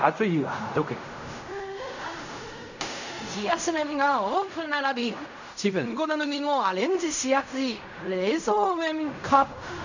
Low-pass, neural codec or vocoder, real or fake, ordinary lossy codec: 7.2 kHz; codec, 16 kHz in and 24 kHz out, 0.4 kbps, LongCat-Audio-Codec, fine tuned four codebook decoder; fake; none